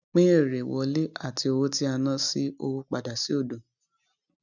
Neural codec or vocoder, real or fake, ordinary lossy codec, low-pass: none; real; none; 7.2 kHz